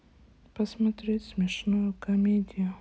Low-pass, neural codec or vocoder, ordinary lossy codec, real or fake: none; none; none; real